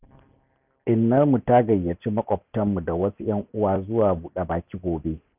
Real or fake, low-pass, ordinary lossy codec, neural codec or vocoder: real; 3.6 kHz; none; none